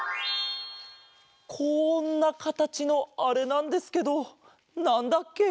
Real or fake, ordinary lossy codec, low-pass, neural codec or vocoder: real; none; none; none